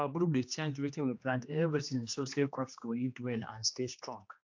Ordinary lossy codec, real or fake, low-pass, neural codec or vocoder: AAC, 48 kbps; fake; 7.2 kHz; codec, 16 kHz, 2 kbps, X-Codec, HuBERT features, trained on general audio